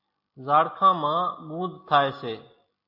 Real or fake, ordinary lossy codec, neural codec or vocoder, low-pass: real; AAC, 48 kbps; none; 5.4 kHz